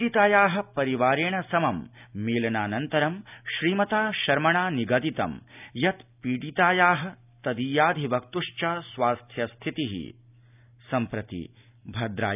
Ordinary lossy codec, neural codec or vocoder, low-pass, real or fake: none; none; 3.6 kHz; real